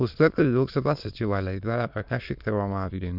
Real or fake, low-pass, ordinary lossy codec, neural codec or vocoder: fake; 5.4 kHz; none; autoencoder, 22.05 kHz, a latent of 192 numbers a frame, VITS, trained on many speakers